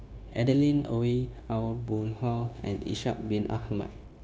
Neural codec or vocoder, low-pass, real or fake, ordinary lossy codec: codec, 16 kHz, 0.9 kbps, LongCat-Audio-Codec; none; fake; none